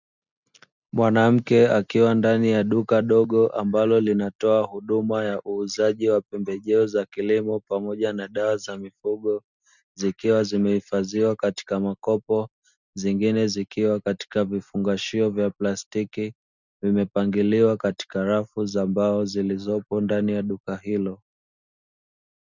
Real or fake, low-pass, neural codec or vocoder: real; 7.2 kHz; none